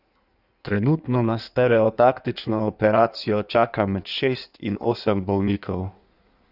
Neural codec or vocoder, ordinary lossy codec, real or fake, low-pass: codec, 16 kHz in and 24 kHz out, 1.1 kbps, FireRedTTS-2 codec; none; fake; 5.4 kHz